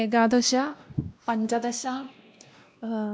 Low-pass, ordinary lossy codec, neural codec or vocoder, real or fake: none; none; codec, 16 kHz, 1 kbps, X-Codec, WavLM features, trained on Multilingual LibriSpeech; fake